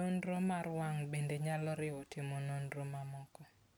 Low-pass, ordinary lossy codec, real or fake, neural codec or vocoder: none; none; real; none